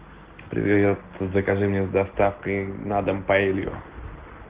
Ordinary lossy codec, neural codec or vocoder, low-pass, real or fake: Opus, 16 kbps; none; 3.6 kHz; real